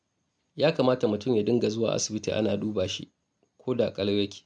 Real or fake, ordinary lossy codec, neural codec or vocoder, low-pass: real; none; none; none